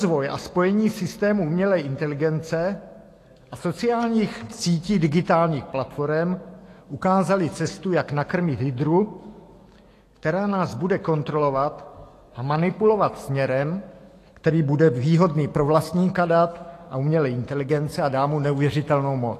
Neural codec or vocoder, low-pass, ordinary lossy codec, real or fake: autoencoder, 48 kHz, 128 numbers a frame, DAC-VAE, trained on Japanese speech; 14.4 kHz; AAC, 48 kbps; fake